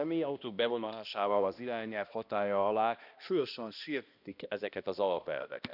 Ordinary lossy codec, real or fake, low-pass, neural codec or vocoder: none; fake; 5.4 kHz; codec, 16 kHz, 1 kbps, X-Codec, HuBERT features, trained on balanced general audio